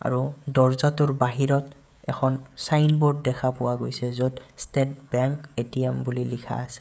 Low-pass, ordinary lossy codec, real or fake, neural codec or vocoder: none; none; fake; codec, 16 kHz, 16 kbps, FreqCodec, smaller model